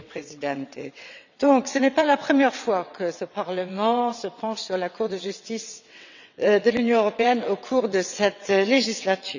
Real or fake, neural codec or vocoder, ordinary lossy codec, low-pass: fake; vocoder, 22.05 kHz, 80 mel bands, WaveNeXt; none; 7.2 kHz